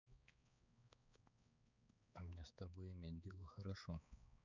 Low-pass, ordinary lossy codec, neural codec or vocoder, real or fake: 7.2 kHz; none; codec, 16 kHz, 4 kbps, X-Codec, HuBERT features, trained on balanced general audio; fake